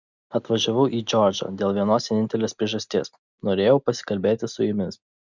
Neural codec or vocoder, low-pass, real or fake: none; 7.2 kHz; real